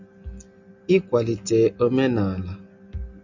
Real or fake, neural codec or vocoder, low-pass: real; none; 7.2 kHz